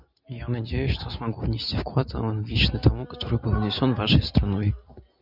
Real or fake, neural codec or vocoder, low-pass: real; none; 5.4 kHz